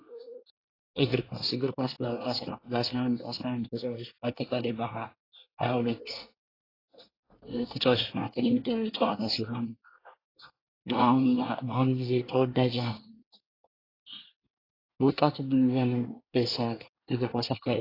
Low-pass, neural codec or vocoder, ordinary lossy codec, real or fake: 5.4 kHz; codec, 24 kHz, 1 kbps, SNAC; AAC, 24 kbps; fake